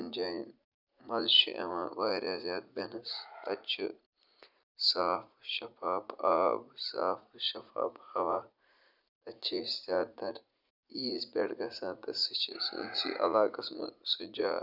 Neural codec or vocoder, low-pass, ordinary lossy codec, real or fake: vocoder, 44.1 kHz, 80 mel bands, Vocos; 5.4 kHz; none; fake